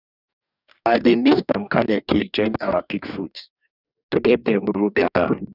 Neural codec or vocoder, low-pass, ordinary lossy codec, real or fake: codec, 44.1 kHz, 2.6 kbps, DAC; 5.4 kHz; none; fake